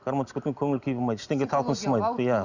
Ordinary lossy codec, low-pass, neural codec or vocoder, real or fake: Opus, 64 kbps; 7.2 kHz; none; real